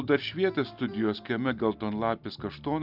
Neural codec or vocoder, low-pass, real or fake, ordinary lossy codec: none; 5.4 kHz; real; Opus, 24 kbps